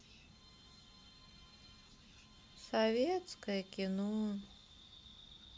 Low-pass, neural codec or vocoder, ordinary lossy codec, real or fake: none; none; none; real